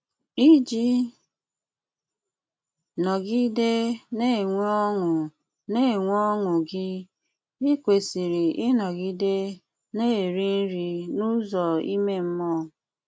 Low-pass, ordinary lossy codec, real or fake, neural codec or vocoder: none; none; real; none